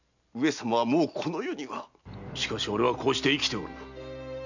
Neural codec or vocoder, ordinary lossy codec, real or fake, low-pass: none; none; real; 7.2 kHz